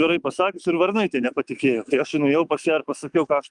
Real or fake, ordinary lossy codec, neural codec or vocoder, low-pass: fake; Opus, 32 kbps; autoencoder, 48 kHz, 128 numbers a frame, DAC-VAE, trained on Japanese speech; 10.8 kHz